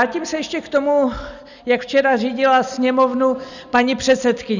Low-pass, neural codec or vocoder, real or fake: 7.2 kHz; none; real